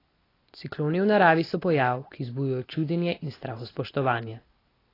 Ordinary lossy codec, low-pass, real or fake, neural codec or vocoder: AAC, 24 kbps; 5.4 kHz; real; none